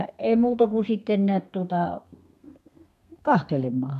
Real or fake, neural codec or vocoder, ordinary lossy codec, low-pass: fake; codec, 32 kHz, 1.9 kbps, SNAC; none; 14.4 kHz